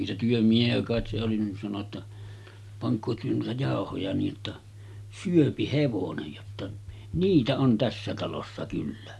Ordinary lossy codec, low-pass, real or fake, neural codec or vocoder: none; none; real; none